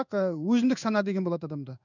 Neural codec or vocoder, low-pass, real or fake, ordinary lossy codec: codec, 16 kHz in and 24 kHz out, 1 kbps, XY-Tokenizer; 7.2 kHz; fake; none